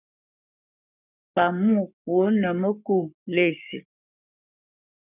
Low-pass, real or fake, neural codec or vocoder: 3.6 kHz; fake; codec, 44.1 kHz, 7.8 kbps, Pupu-Codec